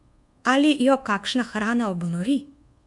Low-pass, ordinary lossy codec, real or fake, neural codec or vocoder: 10.8 kHz; MP3, 64 kbps; fake; codec, 24 kHz, 1.2 kbps, DualCodec